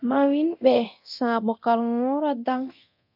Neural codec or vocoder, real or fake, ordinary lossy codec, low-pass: codec, 24 kHz, 0.9 kbps, DualCodec; fake; MP3, 48 kbps; 5.4 kHz